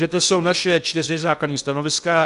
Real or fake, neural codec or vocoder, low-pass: fake; codec, 16 kHz in and 24 kHz out, 0.8 kbps, FocalCodec, streaming, 65536 codes; 10.8 kHz